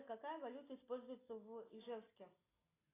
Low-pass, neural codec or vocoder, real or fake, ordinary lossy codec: 3.6 kHz; none; real; AAC, 16 kbps